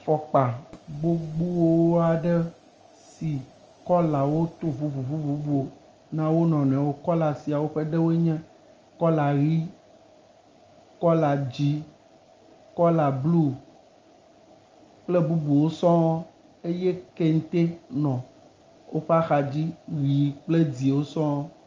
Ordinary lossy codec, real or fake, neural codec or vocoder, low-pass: Opus, 24 kbps; real; none; 7.2 kHz